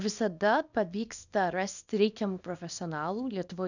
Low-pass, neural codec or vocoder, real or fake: 7.2 kHz; codec, 24 kHz, 0.9 kbps, WavTokenizer, small release; fake